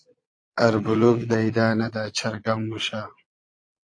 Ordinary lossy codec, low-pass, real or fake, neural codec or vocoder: AAC, 48 kbps; 9.9 kHz; fake; vocoder, 22.05 kHz, 80 mel bands, Vocos